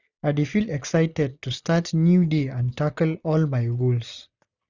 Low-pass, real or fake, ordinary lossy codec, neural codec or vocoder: 7.2 kHz; real; none; none